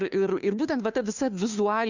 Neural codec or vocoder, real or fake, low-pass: codec, 16 kHz, 2 kbps, FunCodec, trained on Chinese and English, 25 frames a second; fake; 7.2 kHz